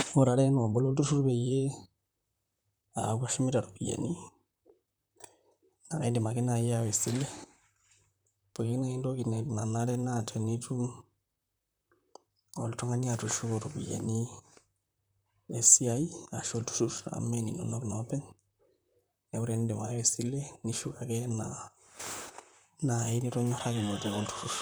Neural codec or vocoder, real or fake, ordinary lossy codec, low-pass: vocoder, 44.1 kHz, 128 mel bands, Pupu-Vocoder; fake; none; none